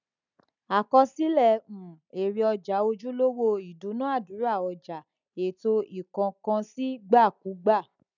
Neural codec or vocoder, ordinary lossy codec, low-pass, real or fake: none; none; 7.2 kHz; real